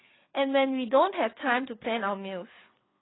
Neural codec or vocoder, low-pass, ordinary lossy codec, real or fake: codec, 16 kHz, 4 kbps, FreqCodec, larger model; 7.2 kHz; AAC, 16 kbps; fake